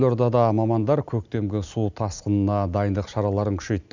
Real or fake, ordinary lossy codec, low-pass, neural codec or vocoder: real; none; 7.2 kHz; none